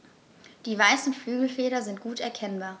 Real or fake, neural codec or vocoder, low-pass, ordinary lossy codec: real; none; none; none